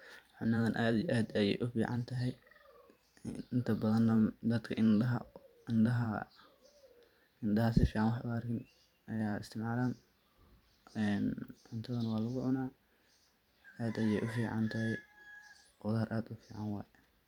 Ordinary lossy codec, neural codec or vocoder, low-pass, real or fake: none; vocoder, 44.1 kHz, 128 mel bands every 512 samples, BigVGAN v2; 19.8 kHz; fake